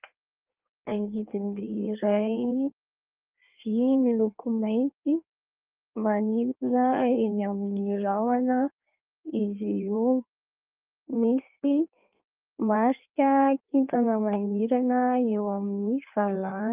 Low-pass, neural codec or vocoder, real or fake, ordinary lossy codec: 3.6 kHz; codec, 16 kHz in and 24 kHz out, 1.1 kbps, FireRedTTS-2 codec; fake; Opus, 24 kbps